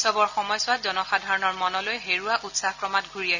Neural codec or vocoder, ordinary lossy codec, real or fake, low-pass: none; none; real; 7.2 kHz